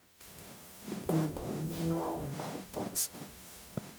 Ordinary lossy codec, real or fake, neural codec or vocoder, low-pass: none; fake; codec, 44.1 kHz, 0.9 kbps, DAC; none